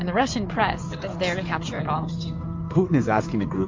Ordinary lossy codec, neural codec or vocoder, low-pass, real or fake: MP3, 48 kbps; codec, 16 kHz in and 24 kHz out, 2.2 kbps, FireRedTTS-2 codec; 7.2 kHz; fake